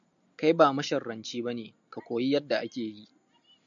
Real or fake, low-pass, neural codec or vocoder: real; 7.2 kHz; none